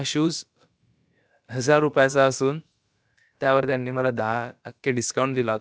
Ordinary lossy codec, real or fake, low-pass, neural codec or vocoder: none; fake; none; codec, 16 kHz, about 1 kbps, DyCAST, with the encoder's durations